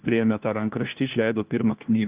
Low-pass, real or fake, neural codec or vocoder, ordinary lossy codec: 3.6 kHz; fake; codec, 16 kHz, 1 kbps, FunCodec, trained on LibriTTS, 50 frames a second; Opus, 24 kbps